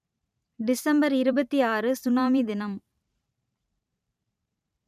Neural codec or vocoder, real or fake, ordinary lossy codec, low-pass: vocoder, 44.1 kHz, 128 mel bands every 512 samples, BigVGAN v2; fake; none; 14.4 kHz